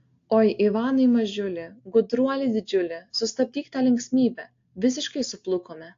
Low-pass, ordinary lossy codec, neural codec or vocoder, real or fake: 7.2 kHz; AAC, 48 kbps; none; real